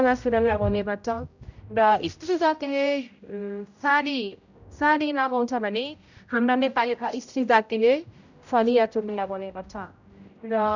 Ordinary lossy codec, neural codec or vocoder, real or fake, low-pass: none; codec, 16 kHz, 0.5 kbps, X-Codec, HuBERT features, trained on general audio; fake; 7.2 kHz